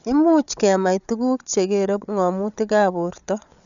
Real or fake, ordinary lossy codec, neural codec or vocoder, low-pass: fake; none; codec, 16 kHz, 8 kbps, FreqCodec, larger model; 7.2 kHz